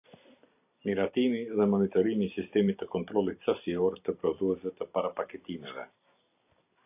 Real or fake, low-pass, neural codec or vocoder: fake; 3.6 kHz; vocoder, 44.1 kHz, 128 mel bands every 256 samples, BigVGAN v2